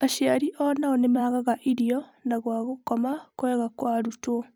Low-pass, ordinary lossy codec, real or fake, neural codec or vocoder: none; none; fake; vocoder, 44.1 kHz, 128 mel bands every 256 samples, BigVGAN v2